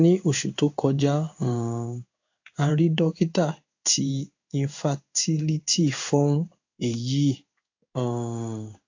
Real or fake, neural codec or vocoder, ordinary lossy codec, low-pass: fake; codec, 16 kHz in and 24 kHz out, 1 kbps, XY-Tokenizer; AAC, 48 kbps; 7.2 kHz